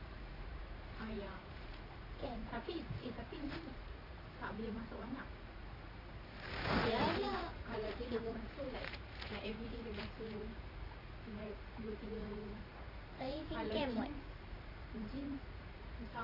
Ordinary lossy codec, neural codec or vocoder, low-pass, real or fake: AAC, 24 kbps; vocoder, 44.1 kHz, 80 mel bands, Vocos; 5.4 kHz; fake